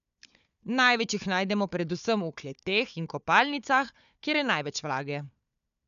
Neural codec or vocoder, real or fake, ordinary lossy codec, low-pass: codec, 16 kHz, 4 kbps, FunCodec, trained on Chinese and English, 50 frames a second; fake; none; 7.2 kHz